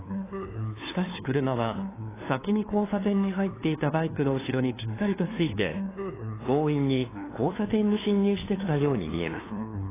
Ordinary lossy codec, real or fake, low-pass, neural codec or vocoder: AAC, 16 kbps; fake; 3.6 kHz; codec, 16 kHz, 2 kbps, FunCodec, trained on LibriTTS, 25 frames a second